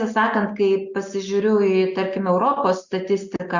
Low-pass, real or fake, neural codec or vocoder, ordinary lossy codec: 7.2 kHz; real; none; Opus, 64 kbps